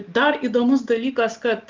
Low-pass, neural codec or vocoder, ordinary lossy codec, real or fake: 7.2 kHz; none; Opus, 24 kbps; real